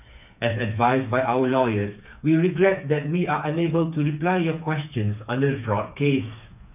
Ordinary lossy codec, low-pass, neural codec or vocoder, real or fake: none; 3.6 kHz; codec, 16 kHz, 4 kbps, FreqCodec, smaller model; fake